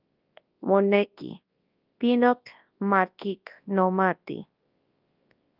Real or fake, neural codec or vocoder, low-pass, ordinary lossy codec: fake; codec, 24 kHz, 0.9 kbps, WavTokenizer, large speech release; 5.4 kHz; Opus, 32 kbps